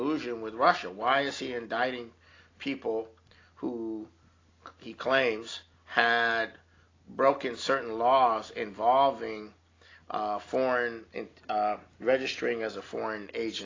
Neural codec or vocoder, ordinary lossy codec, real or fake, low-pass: none; AAC, 32 kbps; real; 7.2 kHz